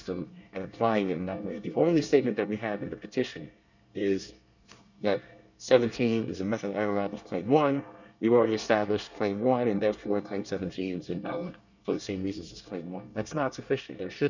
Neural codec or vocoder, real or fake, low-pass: codec, 24 kHz, 1 kbps, SNAC; fake; 7.2 kHz